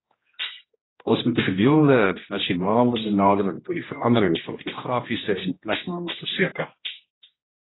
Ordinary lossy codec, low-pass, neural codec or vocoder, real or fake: AAC, 16 kbps; 7.2 kHz; codec, 16 kHz, 1 kbps, X-Codec, HuBERT features, trained on general audio; fake